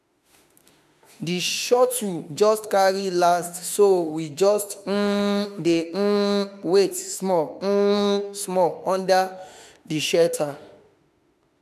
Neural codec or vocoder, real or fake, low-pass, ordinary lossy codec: autoencoder, 48 kHz, 32 numbers a frame, DAC-VAE, trained on Japanese speech; fake; 14.4 kHz; AAC, 96 kbps